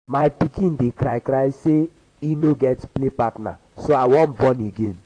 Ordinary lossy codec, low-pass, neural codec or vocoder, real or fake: AAC, 48 kbps; 9.9 kHz; vocoder, 44.1 kHz, 128 mel bands, Pupu-Vocoder; fake